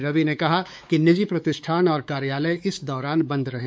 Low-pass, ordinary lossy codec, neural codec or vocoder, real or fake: none; none; codec, 16 kHz, 4 kbps, X-Codec, WavLM features, trained on Multilingual LibriSpeech; fake